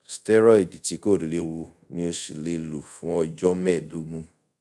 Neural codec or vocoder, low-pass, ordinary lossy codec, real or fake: codec, 24 kHz, 0.5 kbps, DualCodec; none; none; fake